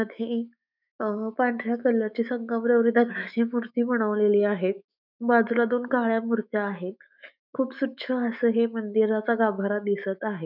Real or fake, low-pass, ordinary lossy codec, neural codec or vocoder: fake; 5.4 kHz; none; autoencoder, 48 kHz, 128 numbers a frame, DAC-VAE, trained on Japanese speech